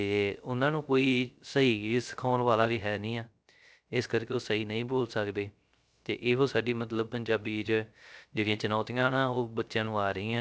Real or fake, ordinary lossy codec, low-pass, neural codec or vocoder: fake; none; none; codec, 16 kHz, 0.3 kbps, FocalCodec